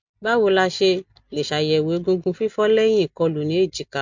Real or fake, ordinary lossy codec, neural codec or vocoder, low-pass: real; MP3, 64 kbps; none; 7.2 kHz